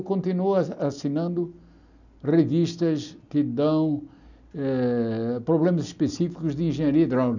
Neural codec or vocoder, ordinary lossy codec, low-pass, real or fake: none; none; 7.2 kHz; real